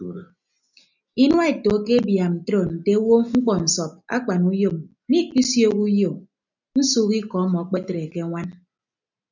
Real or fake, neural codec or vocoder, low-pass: real; none; 7.2 kHz